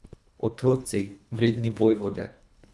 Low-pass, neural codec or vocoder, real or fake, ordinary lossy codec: none; codec, 24 kHz, 1.5 kbps, HILCodec; fake; none